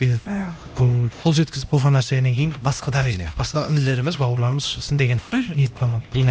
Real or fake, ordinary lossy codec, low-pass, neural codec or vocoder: fake; none; none; codec, 16 kHz, 1 kbps, X-Codec, HuBERT features, trained on LibriSpeech